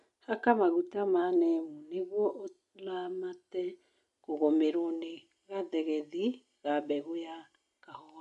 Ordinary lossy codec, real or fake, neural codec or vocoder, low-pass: none; real; none; 10.8 kHz